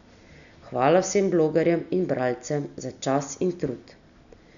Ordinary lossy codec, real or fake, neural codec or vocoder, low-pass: none; real; none; 7.2 kHz